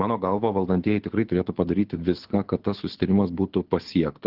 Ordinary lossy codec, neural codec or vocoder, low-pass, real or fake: Opus, 16 kbps; codec, 24 kHz, 6 kbps, HILCodec; 5.4 kHz; fake